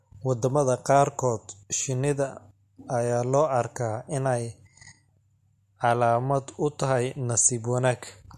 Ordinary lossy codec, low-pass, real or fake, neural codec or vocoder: MP3, 64 kbps; 14.4 kHz; real; none